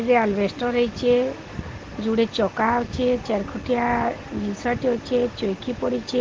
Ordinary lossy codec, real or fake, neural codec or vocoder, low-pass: Opus, 16 kbps; real; none; 7.2 kHz